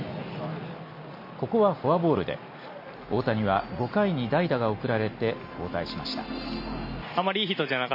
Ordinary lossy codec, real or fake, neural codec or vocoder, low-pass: MP3, 24 kbps; real; none; 5.4 kHz